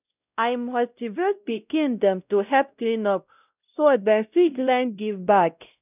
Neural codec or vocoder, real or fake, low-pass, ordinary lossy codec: codec, 16 kHz, 0.5 kbps, X-Codec, WavLM features, trained on Multilingual LibriSpeech; fake; 3.6 kHz; none